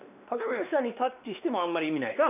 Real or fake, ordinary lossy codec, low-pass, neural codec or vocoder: fake; none; 3.6 kHz; codec, 16 kHz, 2 kbps, X-Codec, WavLM features, trained on Multilingual LibriSpeech